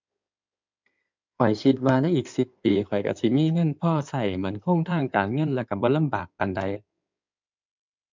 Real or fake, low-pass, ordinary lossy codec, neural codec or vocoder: fake; 7.2 kHz; MP3, 64 kbps; codec, 16 kHz in and 24 kHz out, 2.2 kbps, FireRedTTS-2 codec